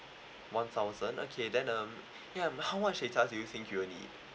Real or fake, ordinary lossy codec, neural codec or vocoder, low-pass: real; none; none; none